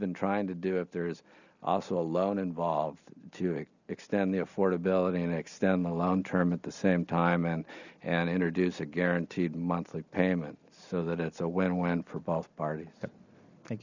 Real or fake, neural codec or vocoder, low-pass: real; none; 7.2 kHz